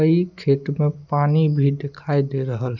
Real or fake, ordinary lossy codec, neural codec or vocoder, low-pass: real; none; none; 7.2 kHz